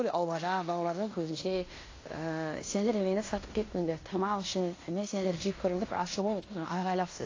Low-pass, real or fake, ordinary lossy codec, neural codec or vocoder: 7.2 kHz; fake; AAC, 32 kbps; codec, 16 kHz in and 24 kHz out, 0.9 kbps, LongCat-Audio-Codec, fine tuned four codebook decoder